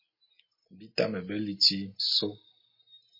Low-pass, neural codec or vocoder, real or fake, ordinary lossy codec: 5.4 kHz; none; real; MP3, 24 kbps